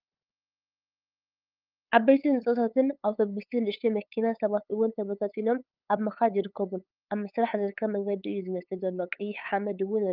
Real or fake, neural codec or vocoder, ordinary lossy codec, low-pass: fake; codec, 16 kHz, 8 kbps, FunCodec, trained on LibriTTS, 25 frames a second; Opus, 24 kbps; 5.4 kHz